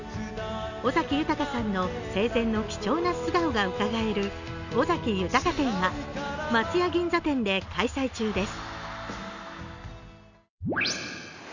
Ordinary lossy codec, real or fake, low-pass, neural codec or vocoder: none; real; 7.2 kHz; none